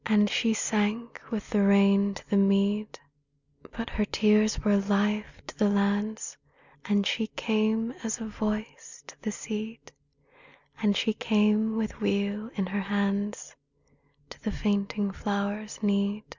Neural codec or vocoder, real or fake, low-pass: none; real; 7.2 kHz